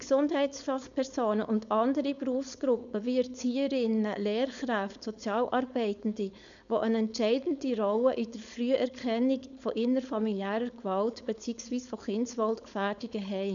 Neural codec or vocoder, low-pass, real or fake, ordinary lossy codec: codec, 16 kHz, 4.8 kbps, FACodec; 7.2 kHz; fake; none